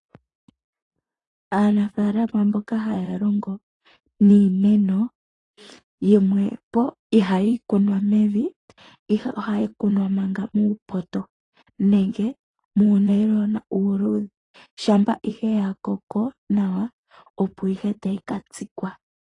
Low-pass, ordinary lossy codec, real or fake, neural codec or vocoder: 10.8 kHz; AAC, 32 kbps; fake; vocoder, 44.1 kHz, 128 mel bands, Pupu-Vocoder